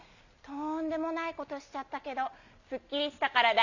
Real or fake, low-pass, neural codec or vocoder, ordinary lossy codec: real; 7.2 kHz; none; none